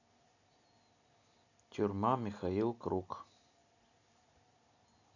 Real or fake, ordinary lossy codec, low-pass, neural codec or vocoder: real; none; 7.2 kHz; none